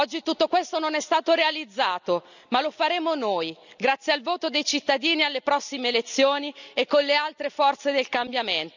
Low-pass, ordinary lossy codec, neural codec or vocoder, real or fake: 7.2 kHz; none; none; real